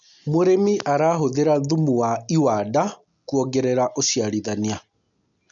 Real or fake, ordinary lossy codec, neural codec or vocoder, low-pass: real; none; none; 7.2 kHz